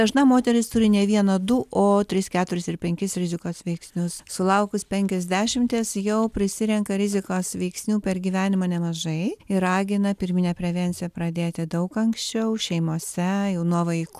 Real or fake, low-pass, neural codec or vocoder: real; 14.4 kHz; none